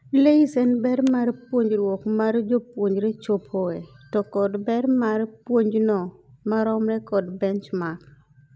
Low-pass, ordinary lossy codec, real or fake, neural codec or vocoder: none; none; real; none